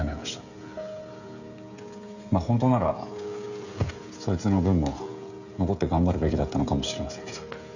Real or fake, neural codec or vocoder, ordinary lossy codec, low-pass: fake; codec, 16 kHz, 16 kbps, FreqCodec, smaller model; none; 7.2 kHz